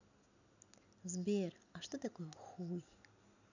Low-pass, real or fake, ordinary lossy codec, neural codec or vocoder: 7.2 kHz; real; none; none